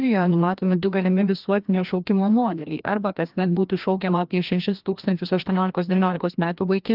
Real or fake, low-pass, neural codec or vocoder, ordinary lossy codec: fake; 5.4 kHz; codec, 16 kHz, 1 kbps, FreqCodec, larger model; Opus, 32 kbps